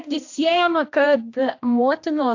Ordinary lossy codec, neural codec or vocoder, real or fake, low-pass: AAC, 48 kbps; codec, 16 kHz, 1 kbps, X-Codec, HuBERT features, trained on general audio; fake; 7.2 kHz